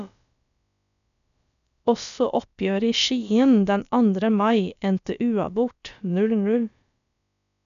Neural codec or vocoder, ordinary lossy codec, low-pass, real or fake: codec, 16 kHz, about 1 kbps, DyCAST, with the encoder's durations; none; 7.2 kHz; fake